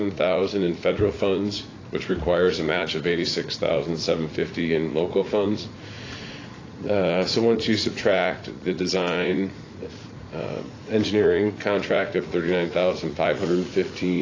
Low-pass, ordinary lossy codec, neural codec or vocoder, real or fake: 7.2 kHz; AAC, 32 kbps; vocoder, 44.1 kHz, 80 mel bands, Vocos; fake